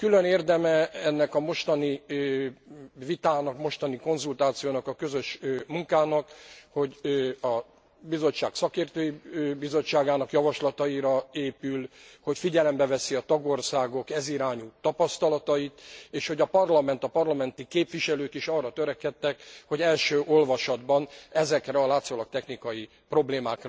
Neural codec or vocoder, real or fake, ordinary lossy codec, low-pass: none; real; none; none